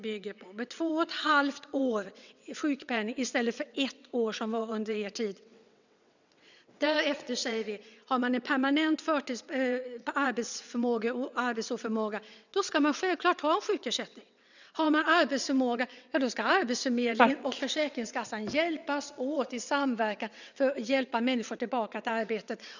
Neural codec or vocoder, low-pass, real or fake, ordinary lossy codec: vocoder, 22.05 kHz, 80 mel bands, WaveNeXt; 7.2 kHz; fake; none